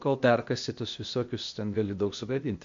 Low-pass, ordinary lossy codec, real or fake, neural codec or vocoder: 7.2 kHz; MP3, 48 kbps; fake; codec, 16 kHz, 0.8 kbps, ZipCodec